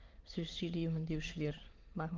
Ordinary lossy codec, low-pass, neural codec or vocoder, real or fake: Opus, 16 kbps; 7.2 kHz; autoencoder, 22.05 kHz, a latent of 192 numbers a frame, VITS, trained on many speakers; fake